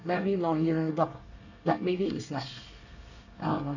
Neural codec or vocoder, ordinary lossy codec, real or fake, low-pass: codec, 24 kHz, 1 kbps, SNAC; none; fake; 7.2 kHz